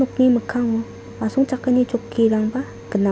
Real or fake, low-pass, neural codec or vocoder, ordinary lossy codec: real; none; none; none